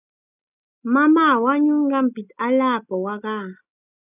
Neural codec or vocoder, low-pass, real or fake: none; 3.6 kHz; real